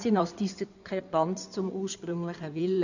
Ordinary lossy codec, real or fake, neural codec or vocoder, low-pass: none; fake; codec, 16 kHz in and 24 kHz out, 2.2 kbps, FireRedTTS-2 codec; 7.2 kHz